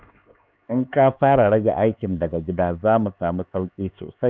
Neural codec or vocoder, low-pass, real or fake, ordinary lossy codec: codec, 16 kHz, 4 kbps, X-Codec, HuBERT features, trained on LibriSpeech; none; fake; none